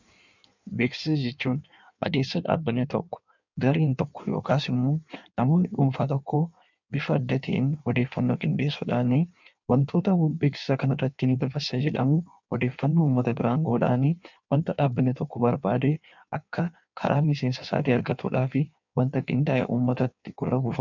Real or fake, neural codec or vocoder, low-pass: fake; codec, 16 kHz in and 24 kHz out, 1.1 kbps, FireRedTTS-2 codec; 7.2 kHz